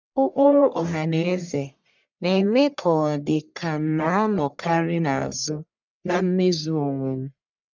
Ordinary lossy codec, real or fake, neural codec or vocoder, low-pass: none; fake; codec, 44.1 kHz, 1.7 kbps, Pupu-Codec; 7.2 kHz